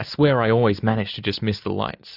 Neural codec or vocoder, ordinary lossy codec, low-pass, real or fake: none; AAC, 48 kbps; 5.4 kHz; real